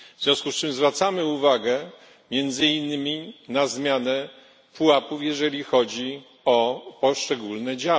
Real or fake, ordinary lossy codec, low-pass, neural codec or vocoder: real; none; none; none